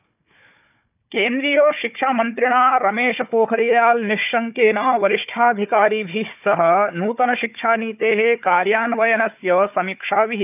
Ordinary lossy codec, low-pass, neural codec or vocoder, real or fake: none; 3.6 kHz; codec, 16 kHz, 4 kbps, FunCodec, trained on Chinese and English, 50 frames a second; fake